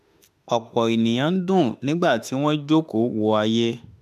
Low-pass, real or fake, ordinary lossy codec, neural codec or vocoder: 14.4 kHz; fake; none; autoencoder, 48 kHz, 32 numbers a frame, DAC-VAE, trained on Japanese speech